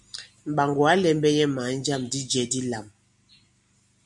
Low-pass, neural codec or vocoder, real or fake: 10.8 kHz; none; real